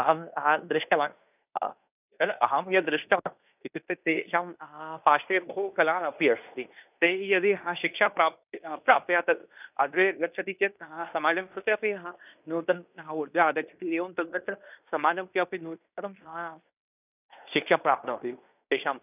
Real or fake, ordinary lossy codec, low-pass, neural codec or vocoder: fake; none; 3.6 kHz; codec, 16 kHz in and 24 kHz out, 0.9 kbps, LongCat-Audio-Codec, fine tuned four codebook decoder